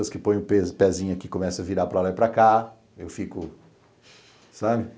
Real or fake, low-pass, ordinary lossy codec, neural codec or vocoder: real; none; none; none